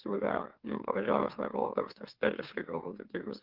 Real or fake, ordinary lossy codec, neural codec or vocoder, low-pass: fake; Opus, 16 kbps; autoencoder, 44.1 kHz, a latent of 192 numbers a frame, MeloTTS; 5.4 kHz